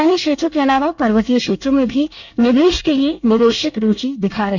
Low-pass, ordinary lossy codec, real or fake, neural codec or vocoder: 7.2 kHz; none; fake; codec, 24 kHz, 1 kbps, SNAC